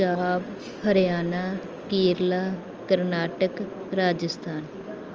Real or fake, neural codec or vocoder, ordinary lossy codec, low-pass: real; none; Opus, 24 kbps; 7.2 kHz